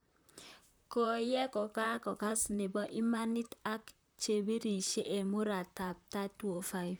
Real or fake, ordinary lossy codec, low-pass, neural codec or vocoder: fake; none; none; vocoder, 44.1 kHz, 128 mel bands, Pupu-Vocoder